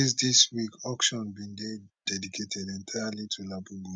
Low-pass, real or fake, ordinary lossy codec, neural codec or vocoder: 9.9 kHz; real; none; none